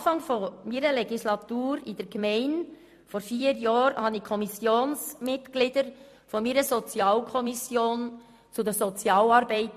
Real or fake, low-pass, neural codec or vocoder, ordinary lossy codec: fake; 14.4 kHz; vocoder, 44.1 kHz, 128 mel bands every 256 samples, BigVGAN v2; MP3, 64 kbps